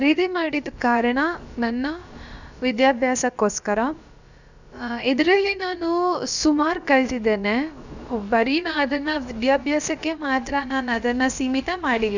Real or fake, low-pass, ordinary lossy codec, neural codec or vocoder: fake; 7.2 kHz; none; codec, 16 kHz, about 1 kbps, DyCAST, with the encoder's durations